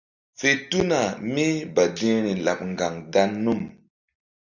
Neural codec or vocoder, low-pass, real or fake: none; 7.2 kHz; real